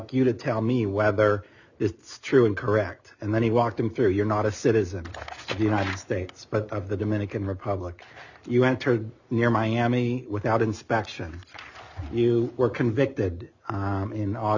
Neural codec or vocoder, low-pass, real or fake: none; 7.2 kHz; real